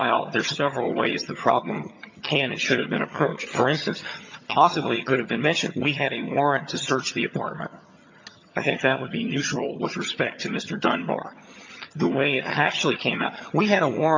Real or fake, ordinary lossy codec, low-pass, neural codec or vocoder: fake; MP3, 48 kbps; 7.2 kHz; vocoder, 22.05 kHz, 80 mel bands, HiFi-GAN